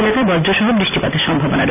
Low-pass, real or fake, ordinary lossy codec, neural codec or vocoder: 3.6 kHz; real; none; none